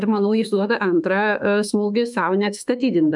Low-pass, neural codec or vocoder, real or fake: 10.8 kHz; autoencoder, 48 kHz, 32 numbers a frame, DAC-VAE, trained on Japanese speech; fake